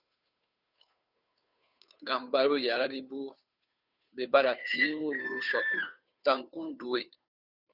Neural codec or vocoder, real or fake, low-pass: codec, 16 kHz, 2 kbps, FunCodec, trained on Chinese and English, 25 frames a second; fake; 5.4 kHz